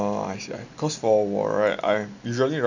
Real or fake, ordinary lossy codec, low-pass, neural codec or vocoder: real; none; 7.2 kHz; none